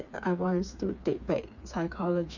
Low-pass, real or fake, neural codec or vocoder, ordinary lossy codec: 7.2 kHz; fake; codec, 16 kHz, 4 kbps, FreqCodec, smaller model; none